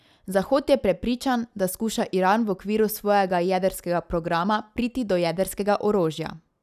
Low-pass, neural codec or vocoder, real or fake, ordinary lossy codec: 14.4 kHz; none; real; none